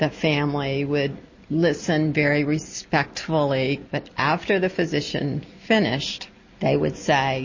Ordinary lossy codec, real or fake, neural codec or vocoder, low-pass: MP3, 32 kbps; real; none; 7.2 kHz